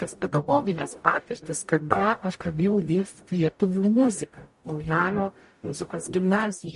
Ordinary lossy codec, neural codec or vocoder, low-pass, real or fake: MP3, 48 kbps; codec, 44.1 kHz, 0.9 kbps, DAC; 14.4 kHz; fake